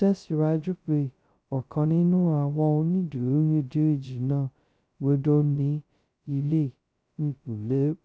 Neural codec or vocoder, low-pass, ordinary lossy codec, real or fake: codec, 16 kHz, 0.2 kbps, FocalCodec; none; none; fake